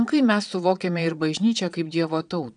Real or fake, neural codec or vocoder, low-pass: fake; vocoder, 22.05 kHz, 80 mel bands, Vocos; 9.9 kHz